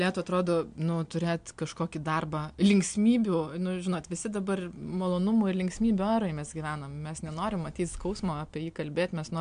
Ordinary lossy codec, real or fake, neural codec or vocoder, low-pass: MP3, 64 kbps; real; none; 9.9 kHz